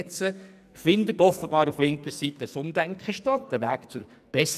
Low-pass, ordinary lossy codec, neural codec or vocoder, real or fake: 14.4 kHz; none; codec, 44.1 kHz, 2.6 kbps, SNAC; fake